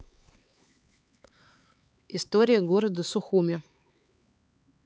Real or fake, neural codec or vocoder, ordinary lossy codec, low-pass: fake; codec, 16 kHz, 4 kbps, X-Codec, HuBERT features, trained on LibriSpeech; none; none